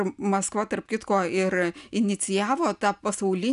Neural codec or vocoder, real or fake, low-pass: none; real; 10.8 kHz